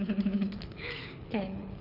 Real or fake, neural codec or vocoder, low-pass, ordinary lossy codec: fake; codec, 24 kHz, 6 kbps, HILCodec; 5.4 kHz; none